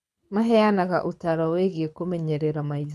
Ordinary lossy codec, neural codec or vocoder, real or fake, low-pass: none; codec, 24 kHz, 6 kbps, HILCodec; fake; none